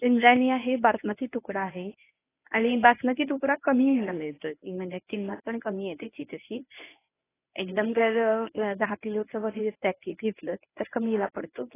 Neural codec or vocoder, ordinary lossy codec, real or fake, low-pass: codec, 24 kHz, 0.9 kbps, WavTokenizer, medium speech release version 1; AAC, 16 kbps; fake; 3.6 kHz